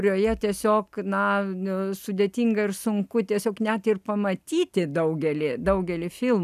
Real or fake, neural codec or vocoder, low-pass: real; none; 14.4 kHz